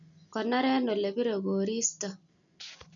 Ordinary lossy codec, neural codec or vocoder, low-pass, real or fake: none; none; 7.2 kHz; real